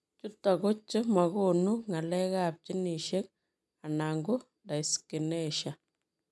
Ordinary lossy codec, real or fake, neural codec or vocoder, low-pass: none; real; none; none